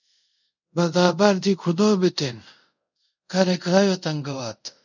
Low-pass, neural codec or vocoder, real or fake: 7.2 kHz; codec, 24 kHz, 0.5 kbps, DualCodec; fake